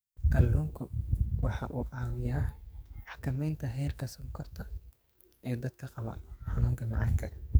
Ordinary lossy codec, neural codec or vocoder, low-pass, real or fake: none; codec, 44.1 kHz, 2.6 kbps, SNAC; none; fake